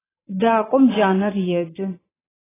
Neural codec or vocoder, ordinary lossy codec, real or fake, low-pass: vocoder, 22.05 kHz, 80 mel bands, Vocos; AAC, 16 kbps; fake; 3.6 kHz